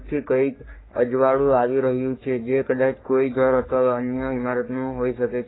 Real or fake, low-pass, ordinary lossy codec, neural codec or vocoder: fake; 7.2 kHz; AAC, 16 kbps; codec, 44.1 kHz, 3.4 kbps, Pupu-Codec